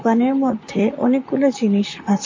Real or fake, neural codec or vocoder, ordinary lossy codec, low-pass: fake; vocoder, 44.1 kHz, 128 mel bands, Pupu-Vocoder; MP3, 32 kbps; 7.2 kHz